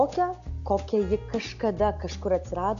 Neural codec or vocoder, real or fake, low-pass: none; real; 7.2 kHz